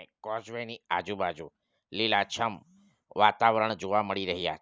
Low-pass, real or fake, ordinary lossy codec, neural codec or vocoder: none; real; none; none